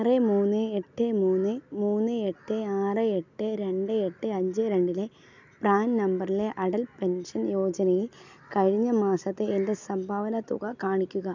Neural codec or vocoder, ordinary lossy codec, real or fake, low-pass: none; none; real; 7.2 kHz